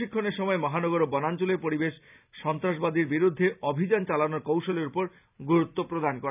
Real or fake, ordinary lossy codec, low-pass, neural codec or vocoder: real; none; 3.6 kHz; none